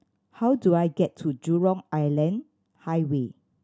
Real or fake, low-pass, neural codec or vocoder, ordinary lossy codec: real; none; none; none